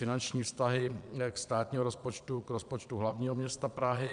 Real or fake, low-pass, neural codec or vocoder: fake; 9.9 kHz; vocoder, 22.05 kHz, 80 mel bands, Vocos